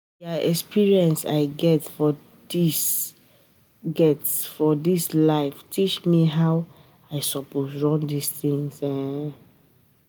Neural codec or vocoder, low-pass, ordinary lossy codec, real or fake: none; none; none; real